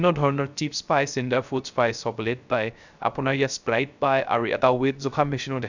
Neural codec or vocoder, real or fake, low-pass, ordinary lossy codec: codec, 16 kHz, 0.3 kbps, FocalCodec; fake; 7.2 kHz; none